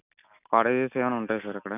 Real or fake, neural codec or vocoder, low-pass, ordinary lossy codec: real; none; 3.6 kHz; none